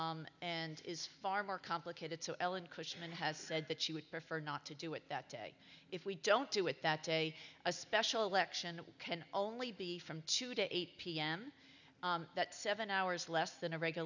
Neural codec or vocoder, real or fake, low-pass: none; real; 7.2 kHz